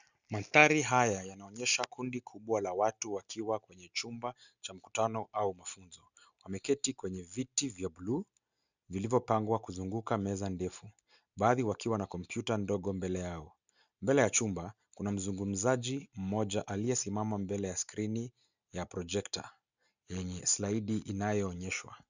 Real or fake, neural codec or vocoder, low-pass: real; none; 7.2 kHz